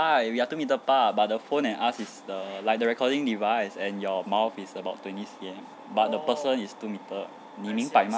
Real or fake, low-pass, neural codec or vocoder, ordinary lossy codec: real; none; none; none